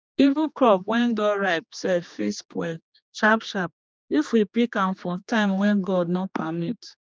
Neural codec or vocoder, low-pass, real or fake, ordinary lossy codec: codec, 16 kHz, 2 kbps, X-Codec, HuBERT features, trained on general audio; none; fake; none